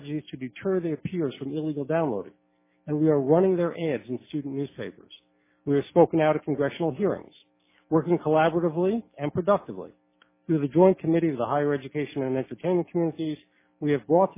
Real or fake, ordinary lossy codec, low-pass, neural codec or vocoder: real; MP3, 16 kbps; 3.6 kHz; none